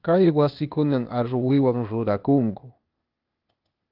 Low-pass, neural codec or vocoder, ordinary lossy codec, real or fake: 5.4 kHz; codec, 16 kHz, 0.8 kbps, ZipCodec; Opus, 32 kbps; fake